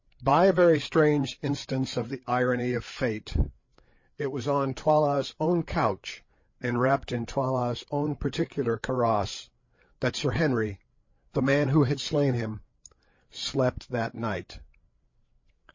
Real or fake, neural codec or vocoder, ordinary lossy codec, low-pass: fake; codec, 16 kHz, 8 kbps, FreqCodec, larger model; MP3, 32 kbps; 7.2 kHz